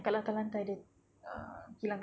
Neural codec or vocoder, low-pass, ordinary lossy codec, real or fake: none; none; none; real